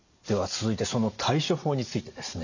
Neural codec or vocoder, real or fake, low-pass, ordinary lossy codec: none; real; 7.2 kHz; none